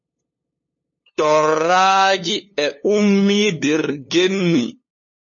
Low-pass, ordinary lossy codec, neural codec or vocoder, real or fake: 7.2 kHz; MP3, 32 kbps; codec, 16 kHz, 2 kbps, FunCodec, trained on LibriTTS, 25 frames a second; fake